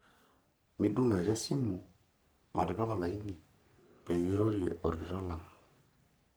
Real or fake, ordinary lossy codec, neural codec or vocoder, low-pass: fake; none; codec, 44.1 kHz, 3.4 kbps, Pupu-Codec; none